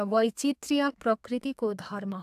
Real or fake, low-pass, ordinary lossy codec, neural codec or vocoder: fake; 14.4 kHz; AAC, 96 kbps; codec, 32 kHz, 1.9 kbps, SNAC